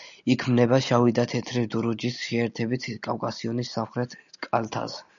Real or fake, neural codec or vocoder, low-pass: real; none; 7.2 kHz